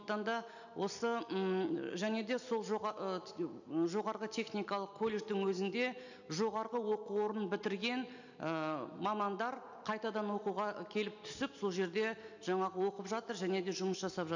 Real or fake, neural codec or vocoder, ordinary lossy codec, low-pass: real; none; none; 7.2 kHz